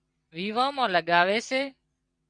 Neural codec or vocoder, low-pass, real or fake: vocoder, 22.05 kHz, 80 mel bands, WaveNeXt; 9.9 kHz; fake